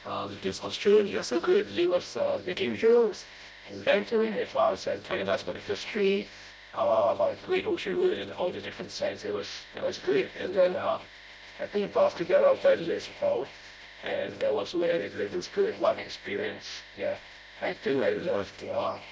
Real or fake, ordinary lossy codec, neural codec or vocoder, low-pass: fake; none; codec, 16 kHz, 0.5 kbps, FreqCodec, smaller model; none